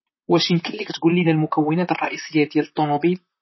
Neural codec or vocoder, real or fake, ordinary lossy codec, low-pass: vocoder, 44.1 kHz, 128 mel bands, Pupu-Vocoder; fake; MP3, 24 kbps; 7.2 kHz